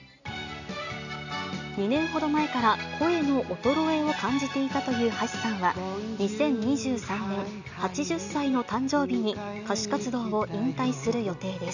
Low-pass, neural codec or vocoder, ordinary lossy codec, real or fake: 7.2 kHz; none; none; real